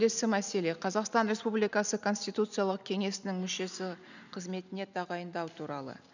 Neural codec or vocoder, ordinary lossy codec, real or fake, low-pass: none; none; real; 7.2 kHz